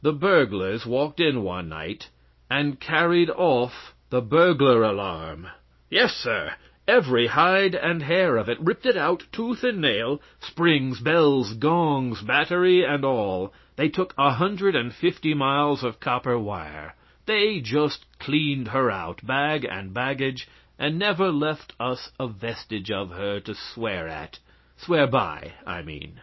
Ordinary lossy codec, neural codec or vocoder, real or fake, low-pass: MP3, 24 kbps; none; real; 7.2 kHz